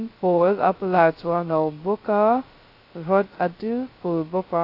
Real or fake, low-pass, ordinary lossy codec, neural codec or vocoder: fake; 5.4 kHz; AAC, 32 kbps; codec, 16 kHz, 0.2 kbps, FocalCodec